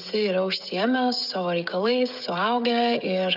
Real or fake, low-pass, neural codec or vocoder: fake; 5.4 kHz; codec, 16 kHz, 16 kbps, FreqCodec, smaller model